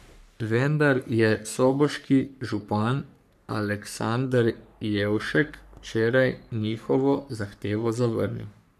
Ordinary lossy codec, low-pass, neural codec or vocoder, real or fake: none; 14.4 kHz; codec, 44.1 kHz, 3.4 kbps, Pupu-Codec; fake